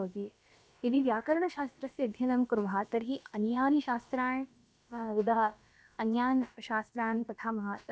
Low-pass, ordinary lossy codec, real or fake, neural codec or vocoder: none; none; fake; codec, 16 kHz, about 1 kbps, DyCAST, with the encoder's durations